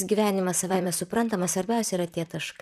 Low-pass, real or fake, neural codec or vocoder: 14.4 kHz; fake; vocoder, 44.1 kHz, 128 mel bands, Pupu-Vocoder